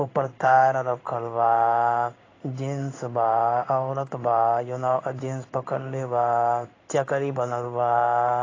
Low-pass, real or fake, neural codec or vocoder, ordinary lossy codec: 7.2 kHz; fake; codec, 16 kHz in and 24 kHz out, 1 kbps, XY-Tokenizer; AAC, 32 kbps